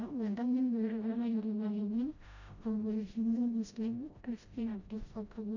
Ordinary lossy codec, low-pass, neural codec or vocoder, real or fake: none; 7.2 kHz; codec, 16 kHz, 0.5 kbps, FreqCodec, smaller model; fake